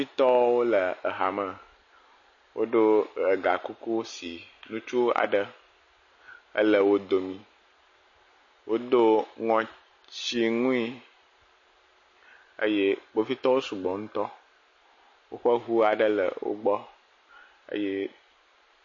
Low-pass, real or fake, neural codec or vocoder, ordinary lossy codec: 7.2 kHz; real; none; MP3, 32 kbps